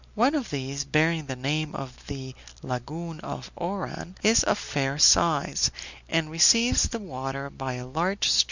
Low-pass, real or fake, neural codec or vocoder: 7.2 kHz; real; none